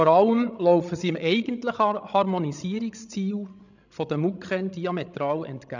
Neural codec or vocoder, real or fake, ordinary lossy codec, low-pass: codec, 16 kHz, 16 kbps, FreqCodec, larger model; fake; none; 7.2 kHz